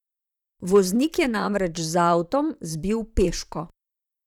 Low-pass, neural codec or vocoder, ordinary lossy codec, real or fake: 19.8 kHz; vocoder, 44.1 kHz, 128 mel bands, Pupu-Vocoder; none; fake